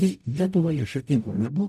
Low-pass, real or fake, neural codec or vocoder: 14.4 kHz; fake; codec, 44.1 kHz, 0.9 kbps, DAC